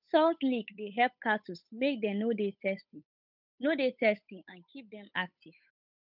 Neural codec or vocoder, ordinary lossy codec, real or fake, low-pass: codec, 16 kHz, 8 kbps, FunCodec, trained on Chinese and English, 25 frames a second; none; fake; 5.4 kHz